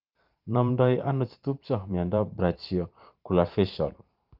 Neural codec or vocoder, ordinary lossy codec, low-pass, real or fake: vocoder, 44.1 kHz, 128 mel bands every 512 samples, BigVGAN v2; Opus, 32 kbps; 5.4 kHz; fake